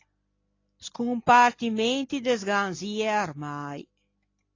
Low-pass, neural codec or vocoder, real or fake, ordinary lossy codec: 7.2 kHz; none; real; AAC, 32 kbps